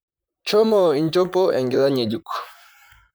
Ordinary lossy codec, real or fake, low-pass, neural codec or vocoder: none; fake; none; vocoder, 44.1 kHz, 128 mel bands, Pupu-Vocoder